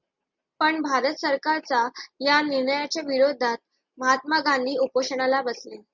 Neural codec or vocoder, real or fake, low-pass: none; real; 7.2 kHz